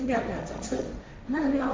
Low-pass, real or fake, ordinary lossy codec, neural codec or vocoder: none; fake; none; codec, 16 kHz, 1.1 kbps, Voila-Tokenizer